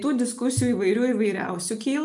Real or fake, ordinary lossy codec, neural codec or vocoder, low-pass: real; MP3, 64 kbps; none; 10.8 kHz